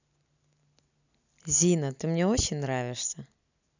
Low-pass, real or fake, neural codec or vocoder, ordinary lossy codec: 7.2 kHz; real; none; none